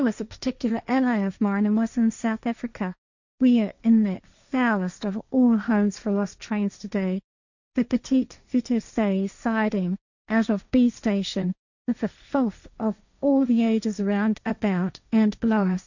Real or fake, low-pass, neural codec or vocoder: fake; 7.2 kHz; codec, 16 kHz, 1.1 kbps, Voila-Tokenizer